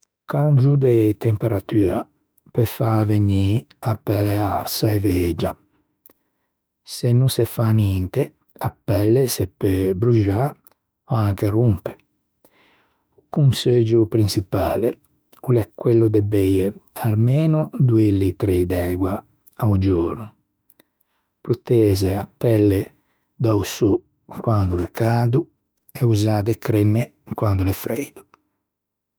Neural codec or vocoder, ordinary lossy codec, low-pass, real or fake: autoencoder, 48 kHz, 32 numbers a frame, DAC-VAE, trained on Japanese speech; none; none; fake